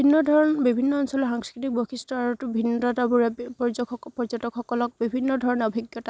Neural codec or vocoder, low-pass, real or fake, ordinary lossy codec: none; none; real; none